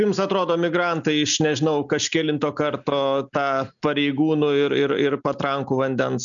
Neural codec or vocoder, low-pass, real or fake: none; 7.2 kHz; real